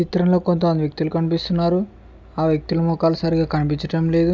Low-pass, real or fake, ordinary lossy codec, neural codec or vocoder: none; real; none; none